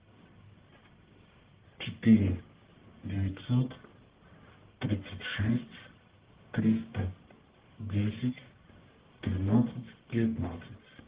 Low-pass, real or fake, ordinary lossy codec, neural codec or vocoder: 3.6 kHz; fake; Opus, 16 kbps; codec, 44.1 kHz, 1.7 kbps, Pupu-Codec